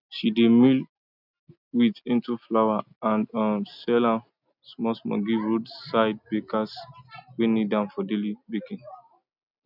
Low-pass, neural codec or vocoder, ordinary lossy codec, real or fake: 5.4 kHz; none; none; real